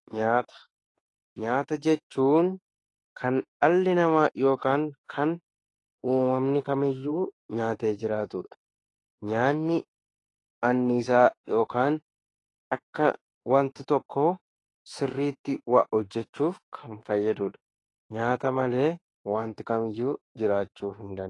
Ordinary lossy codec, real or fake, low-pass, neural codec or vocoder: AAC, 32 kbps; fake; 10.8 kHz; autoencoder, 48 kHz, 32 numbers a frame, DAC-VAE, trained on Japanese speech